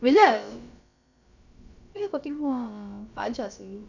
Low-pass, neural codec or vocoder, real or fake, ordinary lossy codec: 7.2 kHz; codec, 16 kHz, about 1 kbps, DyCAST, with the encoder's durations; fake; none